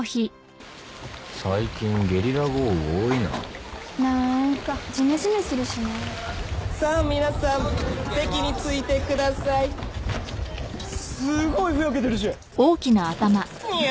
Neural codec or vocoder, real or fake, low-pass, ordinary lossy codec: none; real; none; none